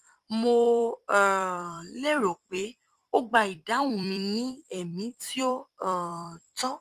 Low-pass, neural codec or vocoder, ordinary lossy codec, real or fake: 14.4 kHz; vocoder, 44.1 kHz, 128 mel bands every 256 samples, BigVGAN v2; Opus, 32 kbps; fake